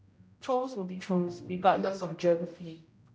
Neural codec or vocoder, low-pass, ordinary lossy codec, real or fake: codec, 16 kHz, 0.5 kbps, X-Codec, HuBERT features, trained on general audio; none; none; fake